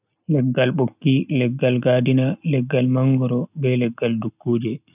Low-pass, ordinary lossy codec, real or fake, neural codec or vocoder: 3.6 kHz; none; real; none